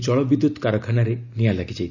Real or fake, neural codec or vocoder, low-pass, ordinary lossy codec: real; none; 7.2 kHz; none